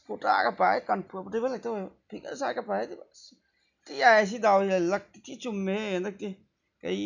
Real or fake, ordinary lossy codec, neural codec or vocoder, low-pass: real; none; none; 7.2 kHz